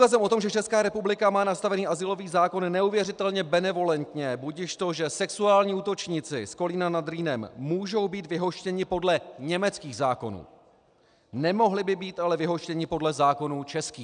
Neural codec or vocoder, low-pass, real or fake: none; 9.9 kHz; real